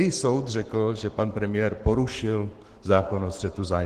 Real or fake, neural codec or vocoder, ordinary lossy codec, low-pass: fake; codec, 44.1 kHz, 7.8 kbps, Pupu-Codec; Opus, 16 kbps; 14.4 kHz